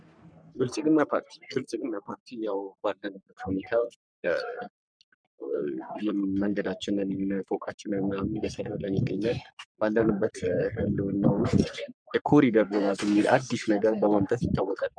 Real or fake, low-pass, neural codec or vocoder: fake; 9.9 kHz; codec, 44.1 kHz, 3.4 kbps, Pupu-Codec